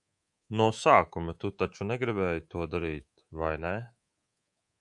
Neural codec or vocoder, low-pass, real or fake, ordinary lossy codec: codec, 24 kHz, 3.1 kbps, DualCodec; 10.8 kHz; fake; MP3, 96 kbps